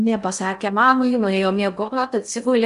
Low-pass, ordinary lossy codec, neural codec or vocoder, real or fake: 10.8 kHz; Opus, 64 kbps; codec, 16 kHz in and 24 kHz out, 0.6 kbps, FocalCodec, streaming, 2048 codes; fake